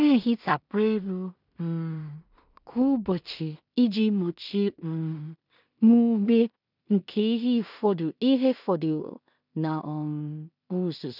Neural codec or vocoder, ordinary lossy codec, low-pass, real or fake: codec, 16 kHz in and 24 kHz out, 0.4 kbps, LongCat-Audio-Codec, two codebook decoder; none; 5.4 kHz; fake